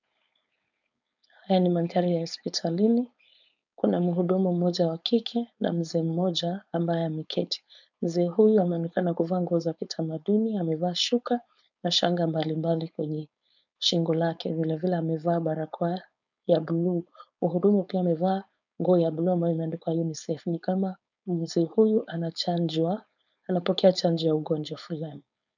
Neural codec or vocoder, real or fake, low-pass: codec, 16 kHz, 4.8 kbps, FACodec; fake; 7.2 kHz